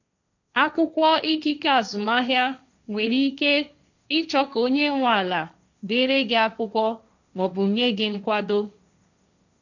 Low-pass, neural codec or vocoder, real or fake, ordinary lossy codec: 7.2 kHz; codec, 16 kHz, 1.1 kbps, Voila-Tokenizer; fake; none